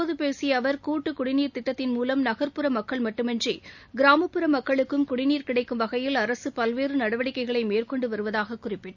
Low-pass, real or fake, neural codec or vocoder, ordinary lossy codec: 7.2 kHz; real; none; none